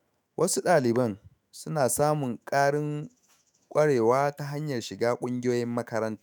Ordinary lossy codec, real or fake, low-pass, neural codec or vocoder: none; fake; none; autoencoder, 48 kHz, 128 numbers a frame, DAC-VAE, trained on Japanese speech